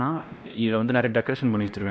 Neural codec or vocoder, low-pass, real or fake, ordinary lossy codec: codec, 16 kHz, 1 kbps, X-Codec, WavLM features, trained on Multilingual LibriSpeech; none; fake; none